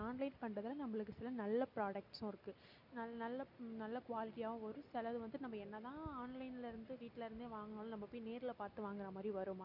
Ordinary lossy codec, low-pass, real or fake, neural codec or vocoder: none; 5.4 kHz; real; none